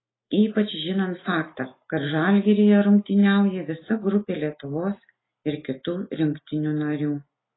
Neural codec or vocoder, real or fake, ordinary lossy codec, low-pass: none; real; AAC, 16 kbps; 7.2 kHz